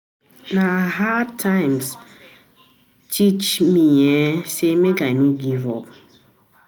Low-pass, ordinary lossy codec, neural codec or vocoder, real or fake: none; none; none; real